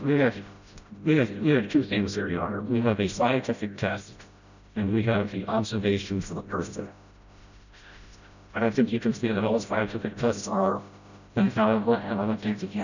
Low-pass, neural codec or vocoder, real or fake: 7.2 kHz; codec, 16 kHz, 0.5 kbps, FreqCodec, smaller model; fake